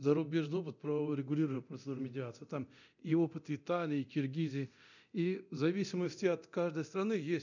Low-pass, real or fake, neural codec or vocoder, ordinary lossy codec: 7.2 kHz; fake; codec, 24 kHz, 0.9 kbps, DualCodec; none